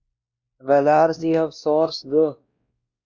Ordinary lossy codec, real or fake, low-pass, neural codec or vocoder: Opus, 64 kbps; fake; 7.2 kHz; codec, 16 kHz, 1 kbps, X-Codec, WavLM features, trained on Multilingual LibriSpeech